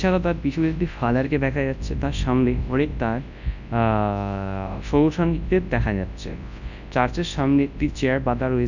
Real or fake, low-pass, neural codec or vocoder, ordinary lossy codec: fake; 7.2 kHz; codec, 24 kHz, 0.9 kbps, WavTokenizer, large speech release; none